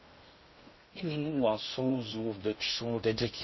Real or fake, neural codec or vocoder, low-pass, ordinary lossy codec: fake; codec, 16 kHz in and 24 kHz out, 0.6 kbps, FocalCodec, streaming, 2048 codes; 7.2 kHz; MP3, 24 kbps